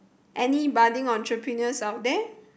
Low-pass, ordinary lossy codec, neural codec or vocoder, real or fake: none; none; none; real